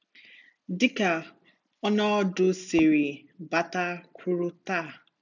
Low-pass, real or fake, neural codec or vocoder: 7.2 kHz; real; none